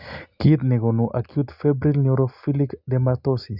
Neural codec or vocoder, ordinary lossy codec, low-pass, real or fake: none; Opus, 64 kbps; 5.4 kHz; real